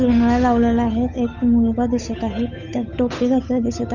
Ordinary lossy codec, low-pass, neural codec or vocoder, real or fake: none; 7.2 kHz; codec, 16 kHz, 8 kbps, FunCodec, trained on Chinese and English, 25 frames a second; fake